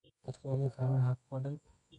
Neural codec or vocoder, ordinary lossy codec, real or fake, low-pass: codec, 24 kHz, 0.9 kbps, WavTokenizer, medium music audio release; none; fake; 10.8 kHz